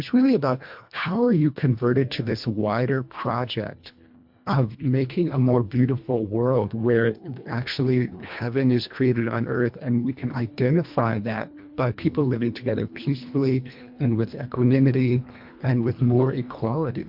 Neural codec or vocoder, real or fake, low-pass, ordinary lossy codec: codec, 24 kHz, 1.5 kbps, HILCodec; fake; 5.4 kHz; MP3, 48 kbps